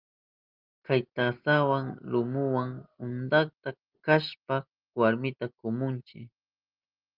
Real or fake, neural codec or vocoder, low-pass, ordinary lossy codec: real; none; 5.4 kHz; Opus, 24 kbps